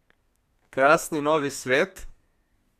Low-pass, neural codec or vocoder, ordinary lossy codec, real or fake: 14.4 kHz; codec, 32 kHz, 1.9 kbps, SNAC; none; fake